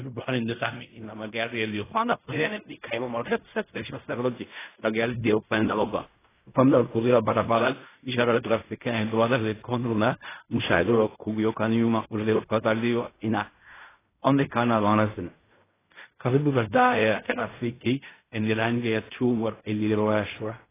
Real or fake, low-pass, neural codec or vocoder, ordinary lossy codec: fake; 3.6 kHz; codec, 16 kHz in and 24 kHz out, 0.4 kbps, LongCat-Audio-Codec, fine tuned four codebook decoder; AAC, 16 kbps